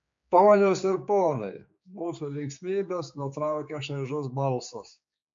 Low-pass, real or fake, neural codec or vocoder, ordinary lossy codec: 7.2 kHz; fake; codec, 16 kHz, 4 kbps, X-Codec, HuBERT features, trained on general audio; MP3, 48 kbps